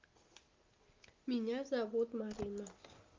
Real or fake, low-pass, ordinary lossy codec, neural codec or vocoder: real; 7.2 kHz; Opus, 32 kbps; none